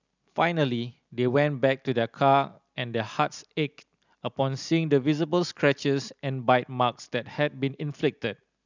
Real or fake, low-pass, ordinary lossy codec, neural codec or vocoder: real; 7.2 kHz; none; none